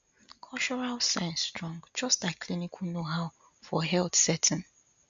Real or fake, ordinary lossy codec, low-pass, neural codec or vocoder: real; MP3, 64 kbps; 7.2 kHz; none